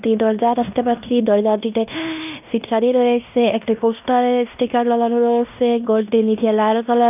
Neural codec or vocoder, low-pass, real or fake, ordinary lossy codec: codec, 16 kHz in and 24 kHz out, 0.9 kbps, LongCat-Audio-Codec, fine tuned four codebook decoder; 3.6 kHz; fake; none